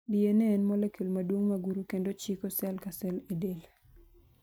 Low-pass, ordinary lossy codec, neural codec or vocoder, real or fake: none; none; none; real